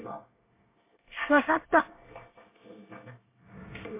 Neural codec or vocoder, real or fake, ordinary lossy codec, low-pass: codec, 24 kHz, 1 kbps, SNAC; fake; MP3, 24 kbps; 3.6 kHz